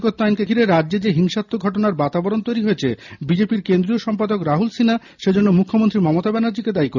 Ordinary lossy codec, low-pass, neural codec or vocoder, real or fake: none; 7.2 kHz; none; real